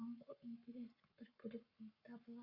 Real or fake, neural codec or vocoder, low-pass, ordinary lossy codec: fake; codec, 44.1 kHz, 7.8 kbps, Pupu-Codec; 5.4 kHz; Opus, 64 kbps